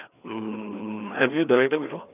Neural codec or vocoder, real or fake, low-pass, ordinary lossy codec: codec, 16 kHz, 2 kbps, FreqCodec, larger model; fake; 3.6 kHz; none